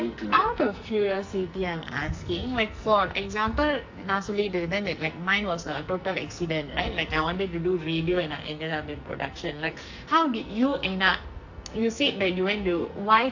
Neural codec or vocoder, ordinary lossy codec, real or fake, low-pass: codec, 44.1 kHz, 2.6 kbps, SNAC; AAC, 48 kbps; fake; 7.2 kHz